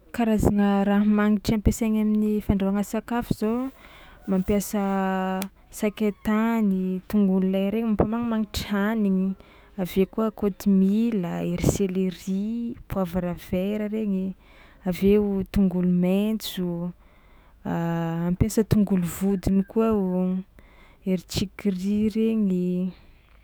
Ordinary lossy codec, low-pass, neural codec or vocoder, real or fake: none; none; autoencoder, 48 kHz, 128 numbers a frame, DAC-VAE, trained on Japanese speech; fake